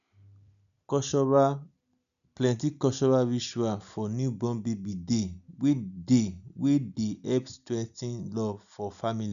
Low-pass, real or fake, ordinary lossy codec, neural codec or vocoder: 7.2 kHz; real; none; none